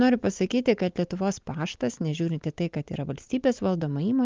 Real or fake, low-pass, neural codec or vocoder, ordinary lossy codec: real; 7.2 kHz; none; Opus, 24 kbps